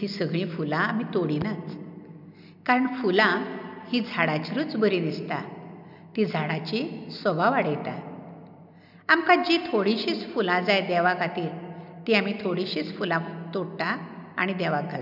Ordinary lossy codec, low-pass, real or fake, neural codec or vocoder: none; 5.4 kHz; real; none